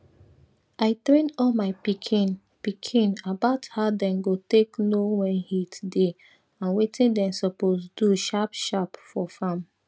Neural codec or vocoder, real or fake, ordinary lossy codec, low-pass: none; real; none; none